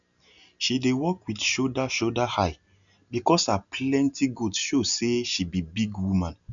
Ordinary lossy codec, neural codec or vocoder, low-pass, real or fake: none; none; 7.2 kHz; real